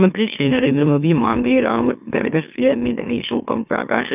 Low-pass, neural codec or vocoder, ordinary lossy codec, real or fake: 3.6 kHz; autoencoder, 44.1 kHz, a latent of 192 numbers a frame, MeloTTS; none; fake